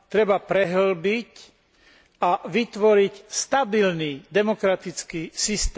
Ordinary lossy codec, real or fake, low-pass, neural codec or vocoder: none; real; none; none